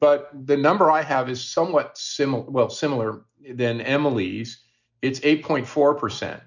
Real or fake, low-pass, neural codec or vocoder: real; 7.2 kHz; none